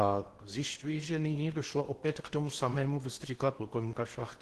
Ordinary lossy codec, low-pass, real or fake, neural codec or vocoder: Opus, 16 kbps; 10.8 kHz; fake; codec, 16 kHz in and 24 kHz out, 0.8 kbps, FocalCodec, streaming, 65536 codes